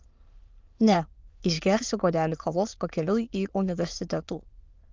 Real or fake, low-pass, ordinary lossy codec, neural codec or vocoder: fake; 7.2 kHz; Opus, 32 kbps; autoencoder, 22.05 kHz, a latent of 192 numbers a frame, VITS, trained on many speakers